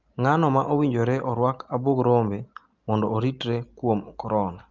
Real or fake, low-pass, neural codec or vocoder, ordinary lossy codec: real; 7.2 kHz; none; Opus, 32 kbps